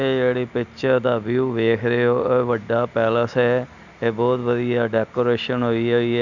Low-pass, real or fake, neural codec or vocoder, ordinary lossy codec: 7.2 kHz; real; none; none